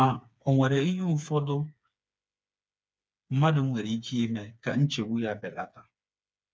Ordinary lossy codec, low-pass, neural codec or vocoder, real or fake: none; none; codec, 16 kHz, 4 kbps, FreqCodec, smaller model; fake